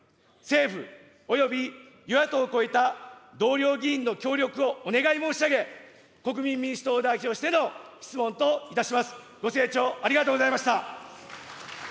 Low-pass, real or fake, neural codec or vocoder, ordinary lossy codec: none; real; none; none